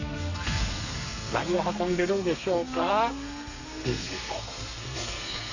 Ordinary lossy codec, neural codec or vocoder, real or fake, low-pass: none; codec, 32 kHz, 1.9 kbps, SNAC; fake; 7.2 kHz